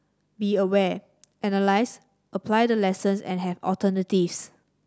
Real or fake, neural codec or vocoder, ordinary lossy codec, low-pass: real; none; none; none